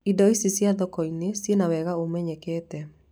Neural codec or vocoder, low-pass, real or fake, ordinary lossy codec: none; none; real; none